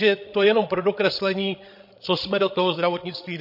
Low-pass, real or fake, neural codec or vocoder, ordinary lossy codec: 5.4 kHz; fake; codec, 16 kHz, 16 kbps, FreqCodec, larger model; MP3, 32 kbps